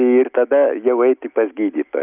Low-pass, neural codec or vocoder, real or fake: 3.6 kHz; none; real